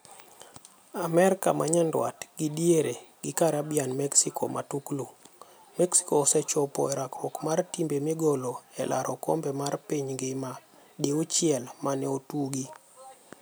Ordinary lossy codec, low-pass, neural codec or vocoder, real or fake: none; none; none; real